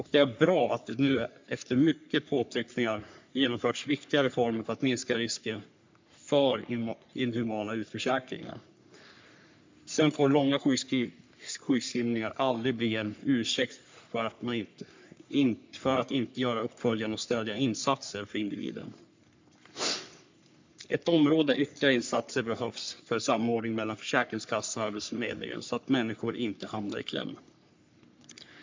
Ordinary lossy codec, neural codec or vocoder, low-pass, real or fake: MP3, 64 kbps; codec, 44.1 kHz, 3.4 kbps, Pupu-Codec; 7.2 kHz; fake